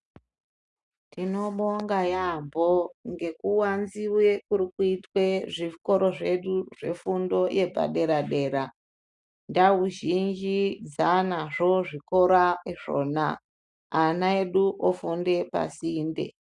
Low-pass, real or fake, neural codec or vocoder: 10.8 kHz; real; none